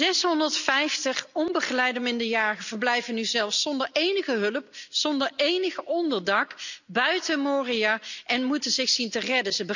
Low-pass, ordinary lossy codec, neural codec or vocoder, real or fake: 7.2 kHz; none; none; real